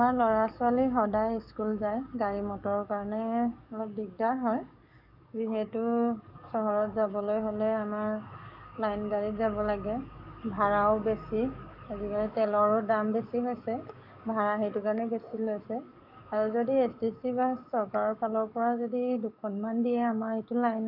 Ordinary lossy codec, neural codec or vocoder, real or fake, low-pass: none; codec, 44.1 kHz, 7.8 kbps, DAC; fake; 5.4 kHz